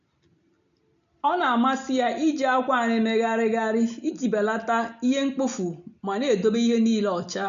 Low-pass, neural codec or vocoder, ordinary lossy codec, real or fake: 7.2 kHz; none; none; real